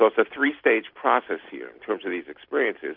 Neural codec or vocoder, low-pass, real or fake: vocoder, 44.1 kHz, 128 mel bands every 256 samples, BigVGAN v2; 5.4 kHz; fake